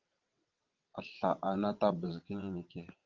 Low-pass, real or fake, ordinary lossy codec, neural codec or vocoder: 7.2 kHz; fake; Opus, 24 kbps; vocoder, 22.05 kHz, 80 mel bands, WaveNeXt